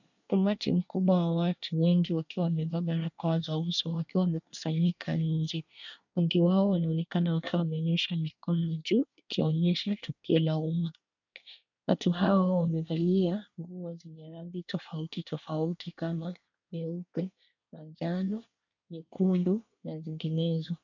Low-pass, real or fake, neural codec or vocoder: 7.2 kHz; fake; codec, 24 kHz, 1 kbps, SNAC